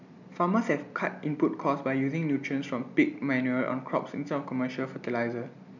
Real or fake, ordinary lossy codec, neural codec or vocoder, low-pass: real; none; none; 7.2 kHz